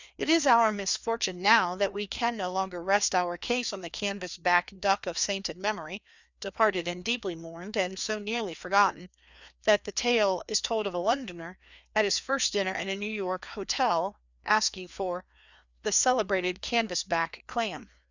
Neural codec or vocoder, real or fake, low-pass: codec, 16 kHz, 2 kbps, FreqCodec, larger model; fake; 7.2 kHz